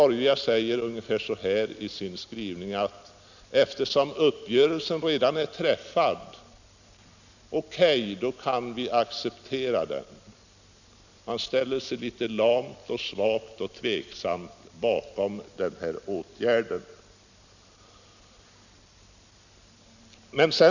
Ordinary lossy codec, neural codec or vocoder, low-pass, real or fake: none; none; 7.2 kHz; real